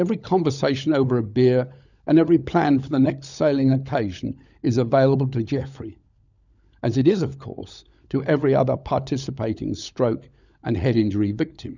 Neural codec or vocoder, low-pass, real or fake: codec, 16 kHz, 16 kbps, FunCodec, trained on LibriTTS, 50 frames a second; 7.2 kHz; fake